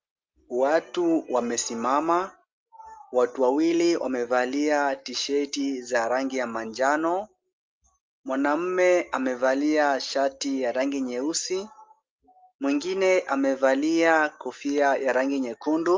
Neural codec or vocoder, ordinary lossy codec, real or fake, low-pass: none; Opus, 32 kbps; real; 7.2 kHz